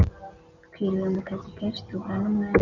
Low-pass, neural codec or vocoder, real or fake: 7.2 kHz; none; real